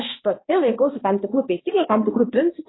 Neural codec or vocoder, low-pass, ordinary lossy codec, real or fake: codec, 16 kHz, 1 kbps, X-Codec, HuBERT features, trained on balanced general audio; 7.2 kHz; AAC, 16 kbps; fake